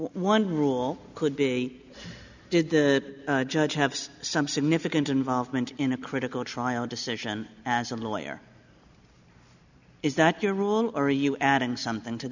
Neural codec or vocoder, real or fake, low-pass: none; real; 7.2 kHz